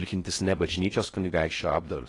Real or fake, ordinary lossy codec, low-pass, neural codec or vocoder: fake; AAC, 32 kbps; 10.8 kHz; codec, 16 kHz in and 24 kHz out, 0.6 kbps, FocalCodec, streaming, 4096 codes